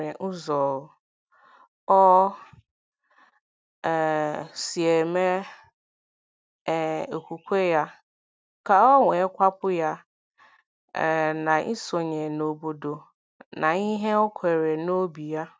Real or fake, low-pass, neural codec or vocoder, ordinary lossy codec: real; none; none; none